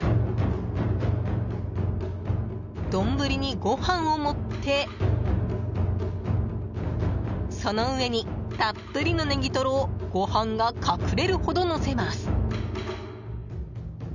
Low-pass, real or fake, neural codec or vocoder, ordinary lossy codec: 7.2 kHz; real; none; none